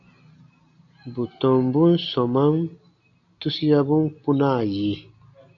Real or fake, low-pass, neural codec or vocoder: real; 7.2 kHz; none